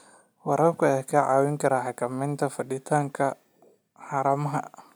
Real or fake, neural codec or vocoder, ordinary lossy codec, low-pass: real; none; none; none